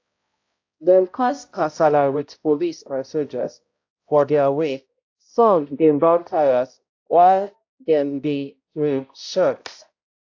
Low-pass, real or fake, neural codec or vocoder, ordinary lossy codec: 7.2 kHz; fake; codec, 16 kHz, 0.5 kbps, X-Codec, HuBERT features, trained on balanced general audio; AAC, 48 kbps